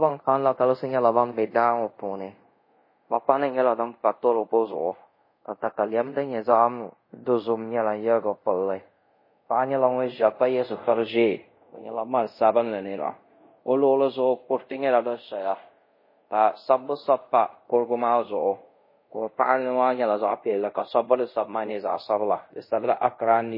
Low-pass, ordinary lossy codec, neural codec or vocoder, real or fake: 5.4 kHz; MP3, 24 kbps; codec, 24 kHz, 0.5 kbps, DualCodec; fake